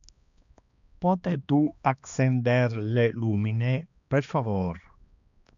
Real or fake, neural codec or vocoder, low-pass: fake; codec, 16 kHz, 2 kbps, X-Codec, HuBERT features, trained on balanced general audio; 7.2 kHz